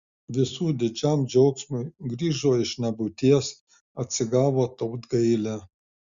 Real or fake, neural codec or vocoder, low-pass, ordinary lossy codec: real; none; 7.2 kHz; Opus, 64 kbps